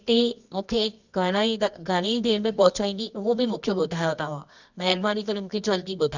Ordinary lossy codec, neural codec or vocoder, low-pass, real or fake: none; codec, 24 kHz, 0.9 kbps, WavTokenizer, medium music audio release; 7.2 kHz; fake